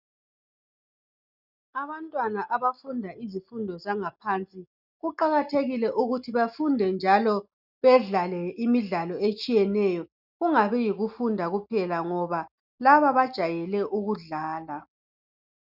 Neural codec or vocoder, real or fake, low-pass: none; real; 5.4 kHz